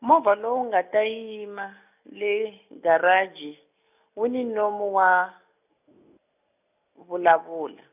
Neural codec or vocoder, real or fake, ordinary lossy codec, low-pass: none; real; none; 3.6 kHz